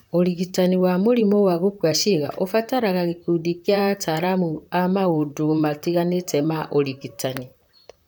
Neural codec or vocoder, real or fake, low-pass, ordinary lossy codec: vocoder, 44.1 kHz, 128 mel bands, Pupu-Vocoder; fake; none; none